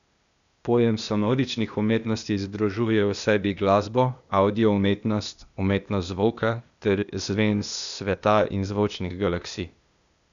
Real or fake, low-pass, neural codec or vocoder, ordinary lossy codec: fake; 7.2 kHz; codec, 16 kHz, 0.8 kbps, ZipCodec; none